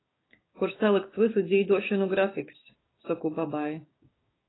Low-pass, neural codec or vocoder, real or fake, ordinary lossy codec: 7.2 kHz; codec, 16 kHz, 6 kbps, DAC; fake; AAC, 16 kbps